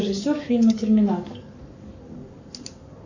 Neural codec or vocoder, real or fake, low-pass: codec, 44.1 kHz, 7.8 kbps, Pupu-Codec; fake; 7.2 kHz